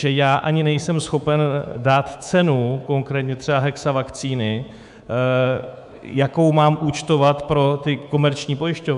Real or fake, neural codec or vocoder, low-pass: fake; codec, 24 kHz, 3.1 kbps, DualCodec; 10.8 kHz